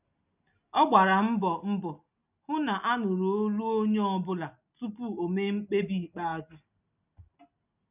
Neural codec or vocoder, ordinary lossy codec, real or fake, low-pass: none; none; real; 3.6 kHz